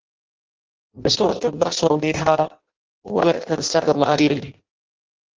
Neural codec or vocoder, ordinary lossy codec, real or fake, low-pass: codec, 16 kHz in and 24 kHz out, 0.6 kbps, FireRedTTS-2 codec; Opus, 16 kbps; fake; 7.2 kHz